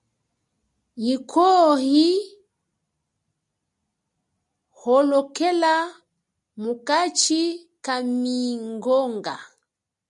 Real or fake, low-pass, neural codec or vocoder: real; 10.8 kHz; none